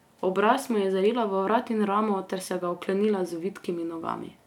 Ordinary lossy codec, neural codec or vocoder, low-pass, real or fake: none; none; 19.8 kHz; real